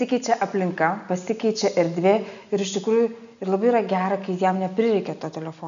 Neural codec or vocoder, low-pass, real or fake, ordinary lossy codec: none; 7.2 kHz; real; AAC, 96 kbps